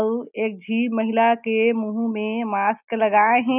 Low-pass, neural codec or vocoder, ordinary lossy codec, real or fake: 3.6 kHz; none; none; real